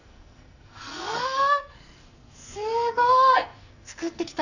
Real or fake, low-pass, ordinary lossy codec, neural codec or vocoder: fake; 7.2 kHz; none; codec, 44.1 kHz, 2.6 kbps, SNAC